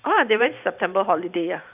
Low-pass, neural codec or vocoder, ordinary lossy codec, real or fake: 3.6 kHz; vocoder, 44.1 kHz, 128 mel bands every 512 samples, BigVGAN v2; none; fake